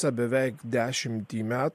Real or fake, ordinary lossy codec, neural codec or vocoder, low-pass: real; MP3, 64 kbps; none; 14.4 kHz